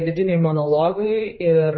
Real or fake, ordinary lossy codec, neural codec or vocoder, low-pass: fake; MP3, 24 kbps; codec, 16 kHz, 2 kbps, X-Codec, HuBERT features, trained on general audio; 7.2 kHz